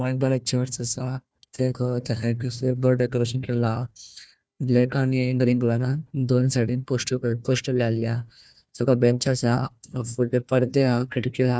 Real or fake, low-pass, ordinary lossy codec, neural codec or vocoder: fake; none; none; codec, 16 kHz, 1 kbps, FunCodec, trained on Chinese and English, 50 frames a second